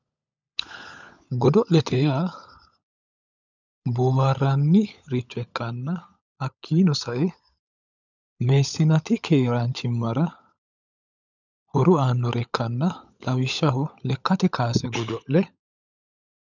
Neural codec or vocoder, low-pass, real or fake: codec, 16 kHz, 16 kbps, FunCodec, trained on LibriTTS, 50 frames a second; 7.2 kHz; fake